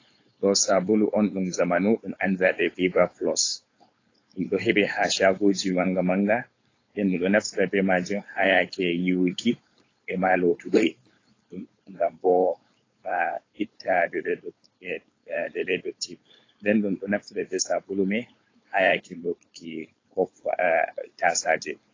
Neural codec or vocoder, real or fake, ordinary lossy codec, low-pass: codec, 16 kHz, 4.8 kbps, FACodec; fake; AAC, 32 kbps; 7.2 kHz